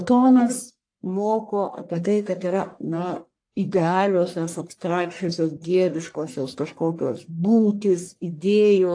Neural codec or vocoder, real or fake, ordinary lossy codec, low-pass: codec, 44.1 kHz, 1.7 kbps, Pupu-Codec; fake; AAC, 48 kbps; 9.9 kHz